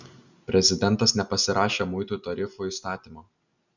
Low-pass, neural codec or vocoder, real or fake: 7.2 kHz; none; real